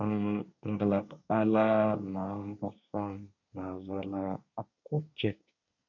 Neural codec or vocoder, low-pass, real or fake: codec, 24 kHz, 1 kbps, SNAC; 7.2 kHz; fake